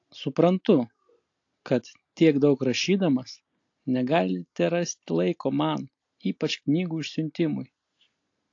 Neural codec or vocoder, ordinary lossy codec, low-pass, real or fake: none; AAC, 48 kbps; 7.2 kHz; real